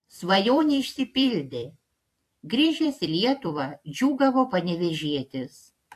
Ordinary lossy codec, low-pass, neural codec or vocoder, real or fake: AAC, 64 kbps; 14.4 kHz; vocoder, 44.1 kHz, 128 mel bands every 256 samples, BigVGAN v2; fake